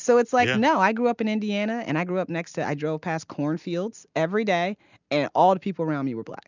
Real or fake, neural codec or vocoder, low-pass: real; none; 7.2 kHz